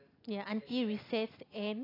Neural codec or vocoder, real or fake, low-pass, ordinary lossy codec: none; real; 5.4 kHz; AAC, 24 kbps